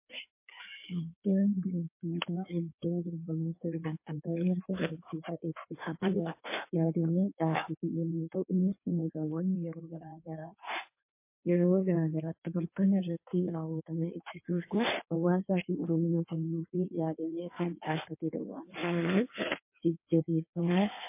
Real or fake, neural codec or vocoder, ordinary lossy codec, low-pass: fake; codec, 16 kHz in and 24 kHz out, 1.1 kbps, FireRedTTS-2 codec; MP3, 24 kbps; 3.6 kHz